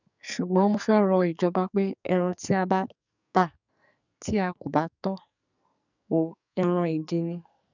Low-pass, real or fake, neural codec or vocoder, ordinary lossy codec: 7.2 kHz; fake; codec, 44.1 kHz, 2.6 kbps, SNAC; none